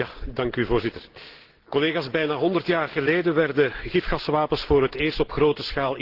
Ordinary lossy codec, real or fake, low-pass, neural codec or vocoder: Opus, 16 kbps; real; 5.4 kHz; none